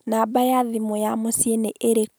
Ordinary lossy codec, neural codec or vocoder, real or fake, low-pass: none; none; real; none